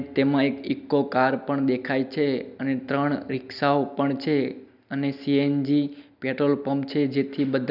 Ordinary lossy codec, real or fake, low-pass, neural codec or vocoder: none; real; 5.4 kHz; none